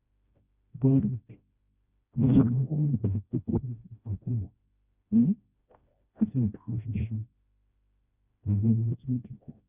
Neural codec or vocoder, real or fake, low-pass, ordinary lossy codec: codec, 16 kHz, 1 kbps, FreqCodec, smaller model; fake; 3.6 kHz; Opus, 64 kbps